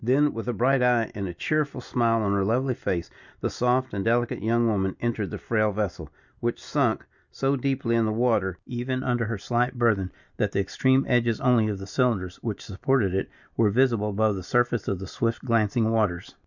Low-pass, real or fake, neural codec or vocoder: 7.2 kHz; real; none